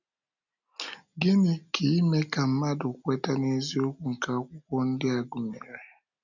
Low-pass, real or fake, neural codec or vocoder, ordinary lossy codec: 7.2 kHz; real; none; none